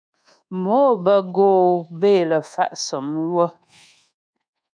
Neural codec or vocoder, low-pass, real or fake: codec, 24 kHz, 1.2 kbps, DualCodec; 9.9 kHz; fake